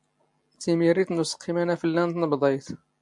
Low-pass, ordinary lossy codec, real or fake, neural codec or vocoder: 10.8 kHz; MP3, 64 kbps; real; none